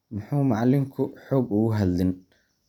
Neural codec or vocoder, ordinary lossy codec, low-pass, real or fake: none; none; 19.8 kHz; real